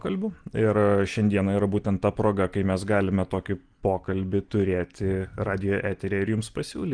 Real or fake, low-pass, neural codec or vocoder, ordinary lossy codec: real; 9.9 kHz; none; Opus, 24 kbps